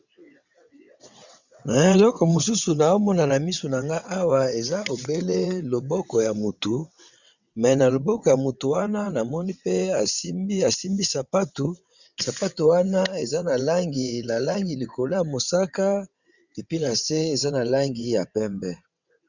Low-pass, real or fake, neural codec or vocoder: 7.2 kHz; fake; vocoder, 22.05 kHz, 80 mel bands, WaveNeXt